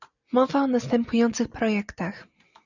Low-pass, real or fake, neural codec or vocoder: 7.2 kHz; real; none